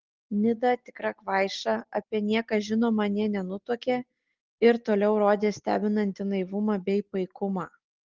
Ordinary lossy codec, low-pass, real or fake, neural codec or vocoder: Opus, 16 kbps; 7.2 kHz; real; none